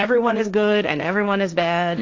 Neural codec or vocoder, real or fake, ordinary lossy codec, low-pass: codec, 16 kHz, 1.1 kbps, Voila-Tokenizer; fake; AAC, 48 kbps; 7.2 kHz